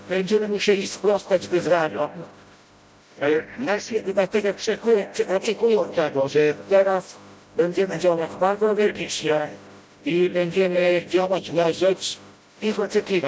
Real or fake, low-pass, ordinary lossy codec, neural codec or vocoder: fake; none; none; codec, 16 kHz, 0.5 kbps, FreqCodec, smaller model